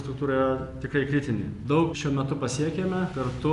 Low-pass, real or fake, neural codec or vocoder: 10.8 kHz; real; none